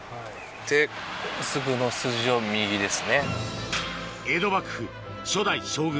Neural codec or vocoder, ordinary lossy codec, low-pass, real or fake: none; none; none; real